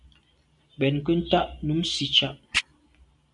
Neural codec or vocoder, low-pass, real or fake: none; 10.8 kHz; real